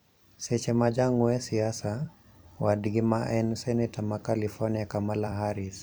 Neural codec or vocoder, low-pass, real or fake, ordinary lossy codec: vocoder, 44.1 kHz, 128 mel bands every 256 samples, BigVGAN v2; none; fake; none